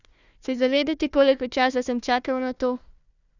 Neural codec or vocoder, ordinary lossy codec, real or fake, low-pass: codec, 16 kHz, 1 kbps, FunCodec, trained on Chinese and English, 50 frames a second; none; fake; 7.2 kHz